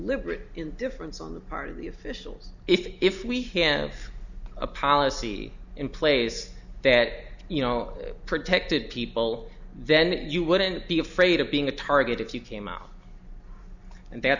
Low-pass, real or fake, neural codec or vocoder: 7.2 kHz; real; none